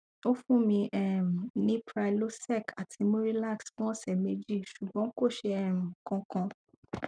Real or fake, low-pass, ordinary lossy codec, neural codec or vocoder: real; 9.9 kHz; none; none